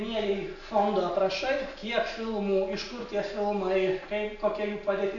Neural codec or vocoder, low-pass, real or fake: none; 7.2 kHz; real